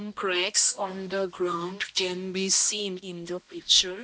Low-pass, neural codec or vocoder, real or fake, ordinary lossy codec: none; codec, 16 kHz, 1 kbps, X-Codec, HuBERT features, trained on balanced general audio; fake; none